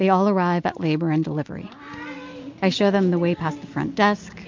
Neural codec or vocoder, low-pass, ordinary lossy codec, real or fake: none; 7.2 kHz; MP3, 48 kbps; real